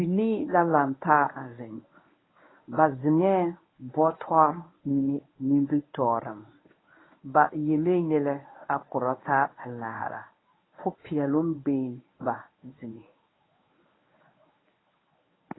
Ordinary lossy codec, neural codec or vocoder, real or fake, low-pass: AAC, 16 kbps; codec, 24 kHz, 0.9 kbps, WavTokenizer, medium speech release version 1; fake; 7.2 kHz